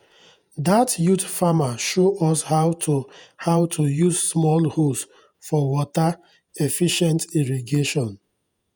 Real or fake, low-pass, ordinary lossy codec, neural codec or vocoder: fake; none; none; vocoder, 48 kHz, 128 mel bands, Vocos